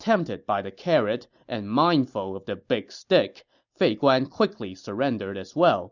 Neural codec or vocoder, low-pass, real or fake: none; 7.2 kHz; real